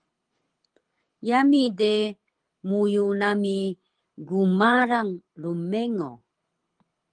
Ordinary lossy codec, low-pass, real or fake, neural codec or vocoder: Opus, 24 kbps; 9.9 kHz; fake; codec, 24 kHz, 6 kbps, HILCodec